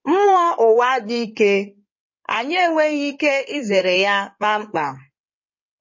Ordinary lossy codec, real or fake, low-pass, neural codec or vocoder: MP3, 32 kbps; fake; 7.2 kHz; codec, 16 kHz in and 24 kHz out, 2.2 kbps, FireRedTTS-2 codec